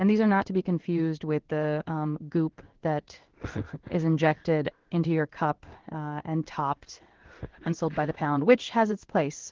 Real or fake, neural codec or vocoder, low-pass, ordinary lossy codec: fake; codec, 16 kHz in and 24 kHz out, 1 kbps, XY-Tokenizer; 7.2 kHz; Opus, 16 kbps